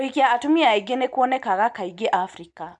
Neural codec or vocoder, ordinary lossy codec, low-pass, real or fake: none; none; 10.8 kHz; real